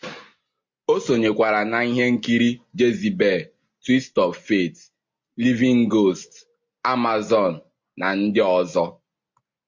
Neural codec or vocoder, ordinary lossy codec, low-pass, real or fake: none; MP3, 48 kbps; 7.2 kHz; real